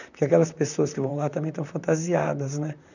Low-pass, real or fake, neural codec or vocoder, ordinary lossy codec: 7.2 kHz; fake; vocoder, 44.1 kHz, 128 mel bands, Pupu-Vocoder; none